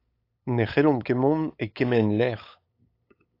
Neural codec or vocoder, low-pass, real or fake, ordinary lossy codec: codec, 16 kHz, 8 kbps, FunCodec, trained on LibriTTS, 25 frames a second; 5.4 kHz; fake; AAC, 32 kbps